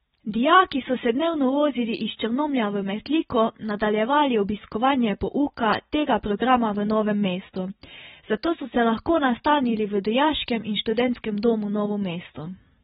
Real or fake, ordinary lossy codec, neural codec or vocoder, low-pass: real; AAC, 16 kbps; none; 19.8 kHz